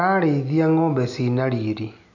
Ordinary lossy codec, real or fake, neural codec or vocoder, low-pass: none; real; none; 7.2 kHz